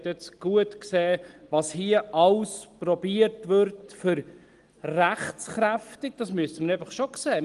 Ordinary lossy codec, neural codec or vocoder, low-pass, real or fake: Opus, 24 kbps; none; 10.8 kHz; real